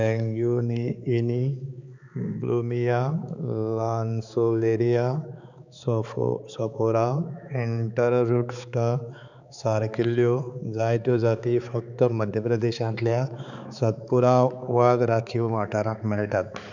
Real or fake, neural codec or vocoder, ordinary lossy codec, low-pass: fake; codec, 16 kHz, 4 kbps, X-Codec, HuBERT features, trained on balanced general audio; none; 7.2 kHz